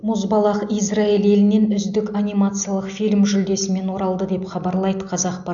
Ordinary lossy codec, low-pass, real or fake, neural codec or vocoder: none; 7.2 kHz; real; none